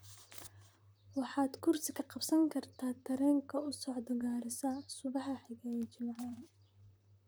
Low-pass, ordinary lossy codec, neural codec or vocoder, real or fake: none; none; vocoder, 44.1 kHz, 128 mel bands, Pupu-Vocoder; fake